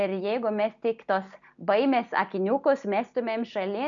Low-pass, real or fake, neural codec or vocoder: 7.2 kHz; real; none